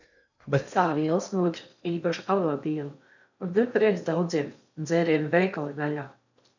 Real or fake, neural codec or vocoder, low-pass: fake; codec, 16 kHz in and 24 kHz out, 0.6 kbps, FocalCodec, streaming, 4096 codes; 7.2 kHz